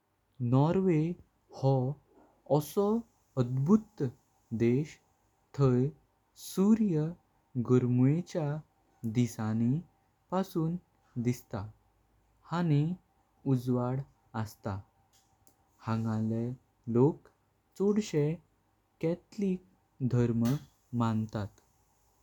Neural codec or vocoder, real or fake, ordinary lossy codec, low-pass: none; real; none; 19.8 kHz